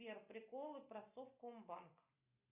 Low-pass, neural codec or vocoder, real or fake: 3.6 kHz; none; real